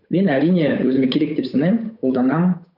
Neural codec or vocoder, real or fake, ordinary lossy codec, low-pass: codec, 16 kHz, 8 kbps, FunCodec, trained on Chinese and English, 25 frames a second; fake; none; 5.4 kHz